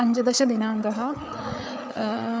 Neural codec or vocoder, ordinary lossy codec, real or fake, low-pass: codec, 16 kHz, 8 kbps, FreqCodec, larger model; none; fake; none